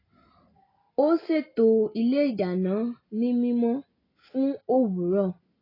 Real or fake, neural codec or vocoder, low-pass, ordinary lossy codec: real; none; 5.4 kHz; AAC, 24 kbps